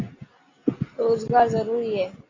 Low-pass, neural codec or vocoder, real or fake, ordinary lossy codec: 7.2 kHz; none; real; AAC, 32 kbps